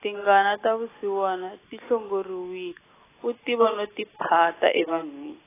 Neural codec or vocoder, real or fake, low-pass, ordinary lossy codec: none; real; 3.6 kHz; AAC, 16 kbps